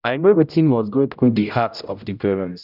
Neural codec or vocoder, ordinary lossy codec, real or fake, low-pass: codec, 16 kHz, 0.5 kbps, X-Codec, HuBERT features, trained on general audio; none; fake; 5.4 kHz